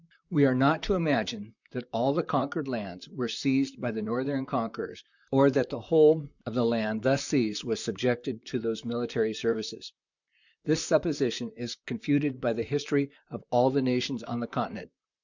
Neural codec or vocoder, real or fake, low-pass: vocoder, 44.1 kHz, 128 mel bands, Pupu-Vocoder; fake; 7.2 kHz